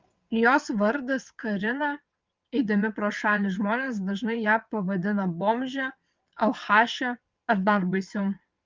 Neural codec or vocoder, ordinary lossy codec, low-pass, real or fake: vocoder, 22.05 kHz, 80 mel bands, WaveNeXt; Opus, 32 kbps; 7.2 kHz; fake